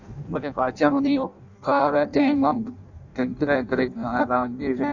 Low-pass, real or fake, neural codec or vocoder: 7.2 kHz; fake; codec, 16 kHz in and 24 kHz out, 0.6 kbps, FireRedTTS-2 codec